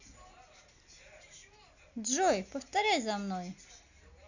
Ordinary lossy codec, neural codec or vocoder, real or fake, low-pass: none; none; real; 7.2 kHz